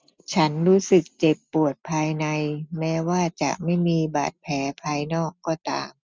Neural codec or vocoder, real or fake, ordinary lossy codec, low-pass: none; real; none; none